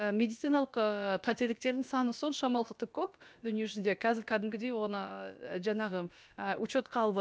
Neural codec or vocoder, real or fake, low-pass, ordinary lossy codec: codec, 16 kHz, about 1 kbps, DyCAST, with the encoder's durations; fake; none; none